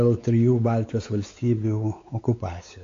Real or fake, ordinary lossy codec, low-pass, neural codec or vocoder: fake; AAC, 48 kbps; 7.2 kHz; codec, 16 kHz, 4 kbps, X-Codec, WavLM features, trained on Multilingual LibriSpeech